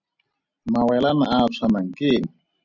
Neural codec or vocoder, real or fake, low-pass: none; real; 7.2 kHz